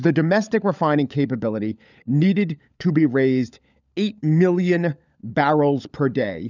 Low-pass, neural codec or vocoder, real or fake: 7.2 kHz; codec, 16 kHz, 16 kbps, FunCodec, trained on LibriTTS, 50 frames a second; fake